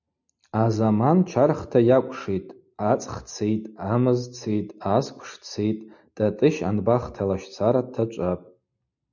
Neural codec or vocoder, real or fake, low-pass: none; real; 7.2 kHz